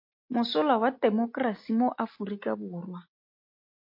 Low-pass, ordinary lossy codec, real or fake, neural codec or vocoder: 5.4 kHz; MP3, 32 kbps; real; none